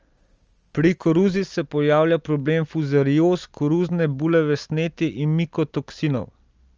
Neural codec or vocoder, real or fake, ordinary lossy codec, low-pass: none; real; Opus, 24 kbps; 7.2 kHz